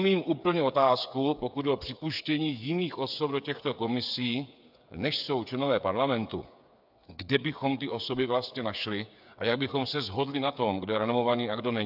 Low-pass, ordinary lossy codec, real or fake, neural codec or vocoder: 5.4 kHz; AAC, 48 kbps; fake; codec, 16 kHz, 8 kbps, FreqCodec, smaller model